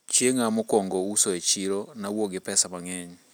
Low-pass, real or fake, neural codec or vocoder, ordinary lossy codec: none; real; none; none